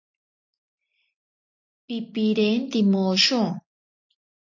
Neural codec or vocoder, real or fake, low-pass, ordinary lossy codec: none; real; 7.2 kHz; AAC, 48 kbps